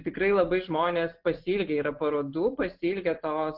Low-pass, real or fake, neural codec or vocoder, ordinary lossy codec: 5.4 kHz; real; none; Opus, 16 kbps